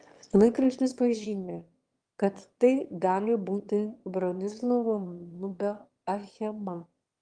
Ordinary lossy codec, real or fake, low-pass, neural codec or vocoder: Opus, 24 kbps; fake; 9.9 kHz; autoencoder, 22.05 kHz, a latent of 192 numbers a frame, VITS, trained on one speaker